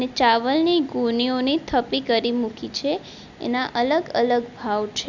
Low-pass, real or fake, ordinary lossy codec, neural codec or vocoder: 7.2 kHz; real; none; none